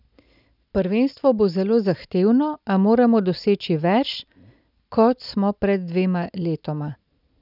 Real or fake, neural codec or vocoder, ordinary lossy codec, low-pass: real; none; none; 5.4 kHz